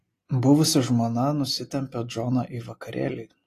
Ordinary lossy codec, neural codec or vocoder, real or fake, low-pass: AAC, 48 kbps; none; real; 14.4 kHz